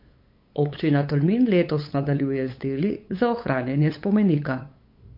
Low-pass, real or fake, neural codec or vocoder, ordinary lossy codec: 5.4 kHz; fake; codec, 16 kHz, 8 kbps, FunCodec, trained on LibriTTS, 25 frames a second; MP3, 32 kbps